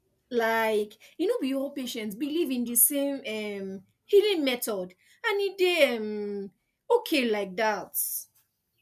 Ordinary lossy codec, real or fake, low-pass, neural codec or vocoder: none; real; 14.4 kHz; none